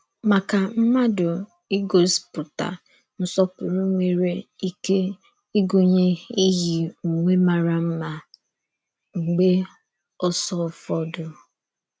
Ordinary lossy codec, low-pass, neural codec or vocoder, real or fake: none; none; none; real